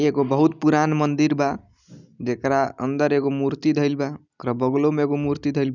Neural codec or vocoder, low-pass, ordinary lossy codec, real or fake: none; 7.2 kHz; none; real